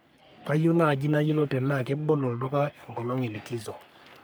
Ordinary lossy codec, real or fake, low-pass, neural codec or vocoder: none; fake; none; codec, 44.1 kHz, 3.4 kbps, Pupu-Codec